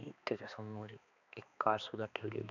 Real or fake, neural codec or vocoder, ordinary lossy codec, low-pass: fake; codec, 16 kHz, 2 kbps, X-Codec, HuBERT features, trained on balanced general audio; none; 7.2 kHz